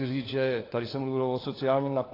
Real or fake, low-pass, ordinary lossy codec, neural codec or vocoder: fake; 5.4 kHz; AAC, 24 kbps; codec, 16 kHz, 4 kbps, FunCodec, trained on LibriTTS, 50 frames a second